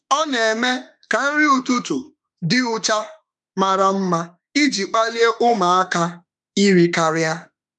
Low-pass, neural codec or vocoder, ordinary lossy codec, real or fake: 10.8 kHz; autoencoder, 48 kHz, 32 numbers a frame, DAC-VAE, trained on Japanese speech; none; fake